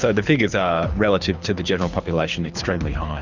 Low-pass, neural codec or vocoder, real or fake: 7.2 kHz; codec, 24 kHz, 6 kbps, HILCodec; fake